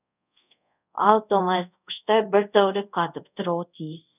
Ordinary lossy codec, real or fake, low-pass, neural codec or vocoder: none; fake; 3.6 kHz; codec, 24 kHz, 0.5 kbps, DualCodec